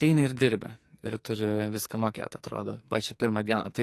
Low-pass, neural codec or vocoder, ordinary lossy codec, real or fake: 14.4 kHz; codec, 44.1 kHz, 2.6 kbps, SNAC; AAC, 64 kbps; fake